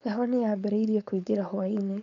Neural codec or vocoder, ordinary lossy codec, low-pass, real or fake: codec, 16 kHz, 4.8 kbps, FACodec; MP3, 96 kbps; 7.2 kHz; fake